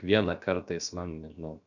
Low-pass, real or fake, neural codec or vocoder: 7.2 kHz; fake; codec, 16 kHz, about 1 kbps, DyCAST, with the encoder's durations